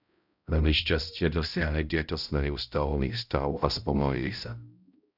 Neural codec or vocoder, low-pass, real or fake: codec, 16 kHz, 0.5 kbps, X-Codec, HuBERT features, trained on balanced general audio; 5.4 kHz; fake